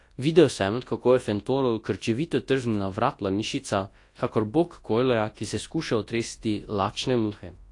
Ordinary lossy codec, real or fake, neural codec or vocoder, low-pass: AAC, 48 kbps; fake; codec, 24 kHz, 0.9 kbps, WavTokenizer, large speech release; 10.8 kHz